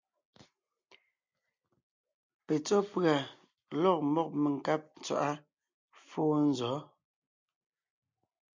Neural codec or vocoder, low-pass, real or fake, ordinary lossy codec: none; 7.2 kHz; real; MP3, 64 kbps